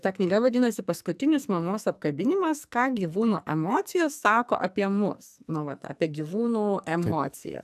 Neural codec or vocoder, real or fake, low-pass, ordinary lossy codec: codec, 44.1 kHz, 2.6 kbps, SNAC; fake; 14.4 kHz; AAC, 96 kbps